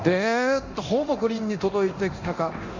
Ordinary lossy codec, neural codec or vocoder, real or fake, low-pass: Opus, 64 kbps; codec, 24 kHz, 0.9 kbps, DualCodec; fake; 7.2 kHz